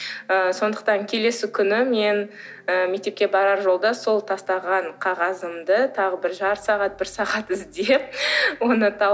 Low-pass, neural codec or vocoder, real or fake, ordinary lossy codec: none; none; real; none